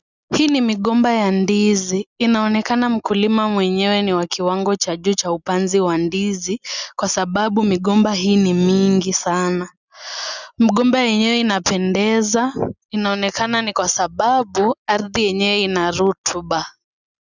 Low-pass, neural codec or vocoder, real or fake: 7.2 kHz; none; real